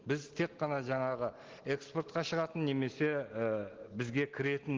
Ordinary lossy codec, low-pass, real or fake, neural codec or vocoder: Opus, 16 kbps; 7.2 kHz; real; none